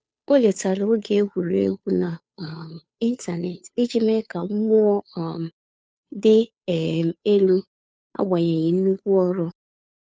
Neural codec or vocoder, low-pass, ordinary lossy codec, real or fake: codec, 16 kHz, 2 kbps, FunCodec, trained on Chinese and English, 25 frames a second; none; none; fake